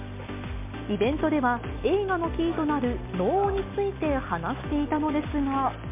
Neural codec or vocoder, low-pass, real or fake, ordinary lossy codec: none; 3.6 kHz; real; MP3, 32 kbps